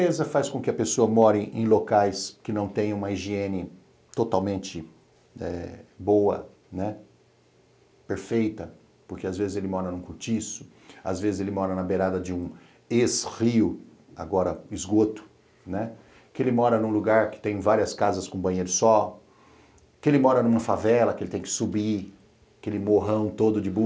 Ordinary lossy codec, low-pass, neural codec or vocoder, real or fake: none; none; none; real